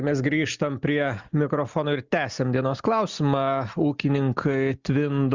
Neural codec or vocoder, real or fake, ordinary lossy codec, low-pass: none; real; Opus, 64 kbps; 7.2 kHz